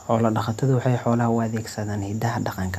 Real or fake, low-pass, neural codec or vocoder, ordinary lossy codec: real; 14.4 kHz; none; none